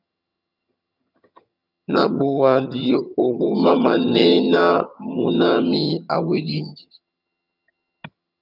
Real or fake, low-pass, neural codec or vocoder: fake; 5.4 kHz; vocoder, 22.05 kHz, 80 mel bands, HiFi-GAN